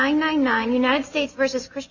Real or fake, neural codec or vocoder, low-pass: real; none; 7.2 kHz